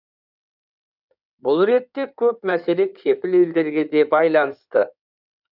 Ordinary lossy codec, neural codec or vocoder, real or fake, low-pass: none; codec, 16 kHz in and 24 kHz out, 2.2 kbps, FireRedTTS-2 codec; fake; 5.4 kHz